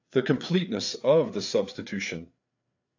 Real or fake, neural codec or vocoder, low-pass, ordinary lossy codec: fake; codec, 16 kHz, 4 kbps, FreqCodec, larger model; 7.2 kHz; AAC, 48 kbps